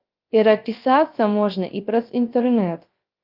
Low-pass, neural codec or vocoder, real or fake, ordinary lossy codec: 5.4 kHz; codec, 16 kHz, 0.3 kbps, FocalCodec; fake; Opus, 24 kbps